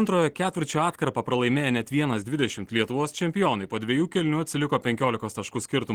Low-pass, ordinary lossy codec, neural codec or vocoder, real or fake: 19.8 kHz; Opus, 16 kbps; none; real